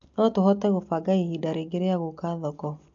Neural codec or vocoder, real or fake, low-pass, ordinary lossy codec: none; real; 7.2 kHz; AAC, 64 kbps